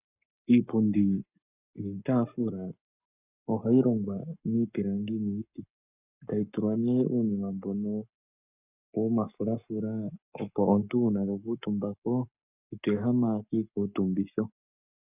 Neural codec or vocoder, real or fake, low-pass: codec, 44.1 kHz, 7.8 kbps, Pupu-Codec; fake; 3.6 kHz